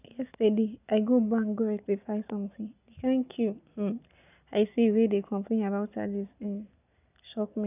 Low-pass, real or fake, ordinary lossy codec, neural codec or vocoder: 3.6 kHz; real; none; none